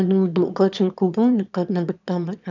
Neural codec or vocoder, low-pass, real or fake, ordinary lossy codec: autoencoder, 22.05 kHz, a latent of 192 numbers a frame, VITS, trained on one speaker; 7.2 kHz; fake; none